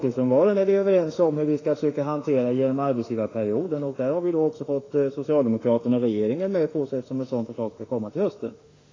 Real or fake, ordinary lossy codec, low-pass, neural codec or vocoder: fake; AAC, 32 kbps; 7.2 kHz; autoencoder, 48 kHz, 32 numbers a frame, DAC-VAE, trained on Japanese speech